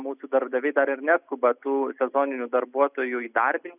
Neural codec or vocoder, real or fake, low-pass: none; real; 3.6 kHz